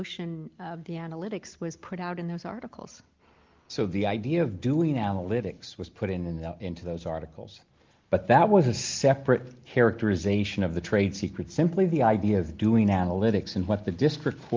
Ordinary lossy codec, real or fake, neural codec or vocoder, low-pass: Opus, 32 kbps; real; none; 7.2 kHz